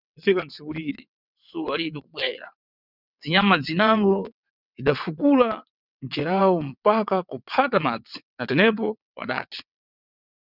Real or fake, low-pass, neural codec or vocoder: fake; 5.4 kHz; vocoder, 22.05 kHz, 80 mel bands, WaveNeXt